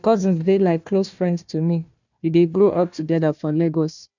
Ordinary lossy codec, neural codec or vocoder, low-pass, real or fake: none; codec, 16 kHz, 1 kbps, FunCodec, trained on Chinese and English, 50 frames a second; 7.2 kHz; fake